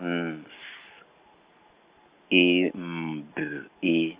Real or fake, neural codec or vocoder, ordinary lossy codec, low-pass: real; none; Opus, 64 kbps; 3.6 kHz